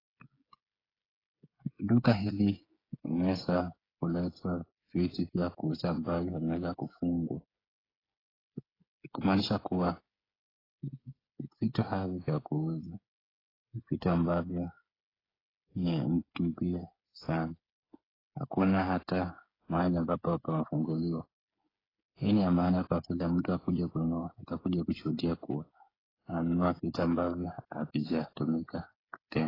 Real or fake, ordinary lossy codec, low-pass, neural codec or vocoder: fake; AAC, 24 kbps; 5.4 kHz; codec, 16 kHz, 8 kbps, FreqCodec, smaller model